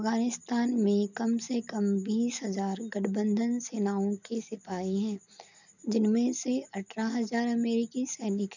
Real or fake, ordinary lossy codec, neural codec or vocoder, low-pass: real; none; none; 7.2 kHz